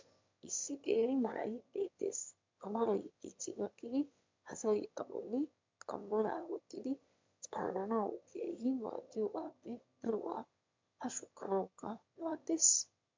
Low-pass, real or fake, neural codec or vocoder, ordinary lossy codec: 7.2 kHz; fake; autoencoder, 22.05 kHz, a latent of 192 numbers a frame, VITS, trained on one speaker; MP3, 64 kbps